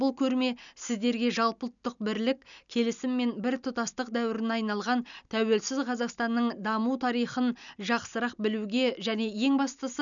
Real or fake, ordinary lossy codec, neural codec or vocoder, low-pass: real; none; none; 7.2 kHz